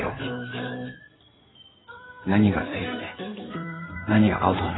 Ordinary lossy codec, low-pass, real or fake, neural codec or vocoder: AAC, 16 kbps; 7.2 kHz; fake; codec, 16 kHz in and 24 kHz out, 2.2 kbps, FireRedTTS-2 codec